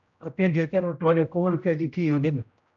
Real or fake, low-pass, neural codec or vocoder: fake; 7.2 kHz; codec, 16 kHz, 0.5 kbps, X-Codec, HuBERT features, trained on general audio